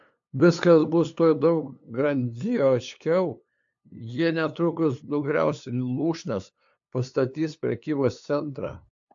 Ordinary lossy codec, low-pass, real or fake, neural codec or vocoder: AAC, 64 kbps; 7.2 kHz; fake; codec, 16 kHz, 2 kbps, FunCodec, trained on LibriTTS, 25 frames a second